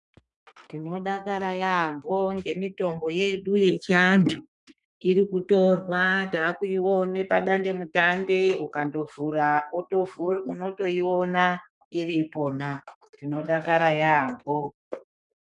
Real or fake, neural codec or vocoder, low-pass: fake; codec, 32 kHz, 1.9 kbps, SNAC; 10.8 kHz